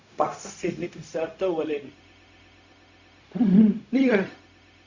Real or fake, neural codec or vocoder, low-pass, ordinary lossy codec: fake; codec, 16 kHz, 0.4 kbps, LongCat-Audio-Codec; 7.2 kHz; Opus, 64 kbps